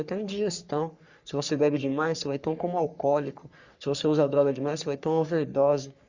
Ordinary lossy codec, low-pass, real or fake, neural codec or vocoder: Opus, 64 kbps; 7.2 kHz; fake; codec, 44.1 kHz, 3.4 kbps, Pupu-Codec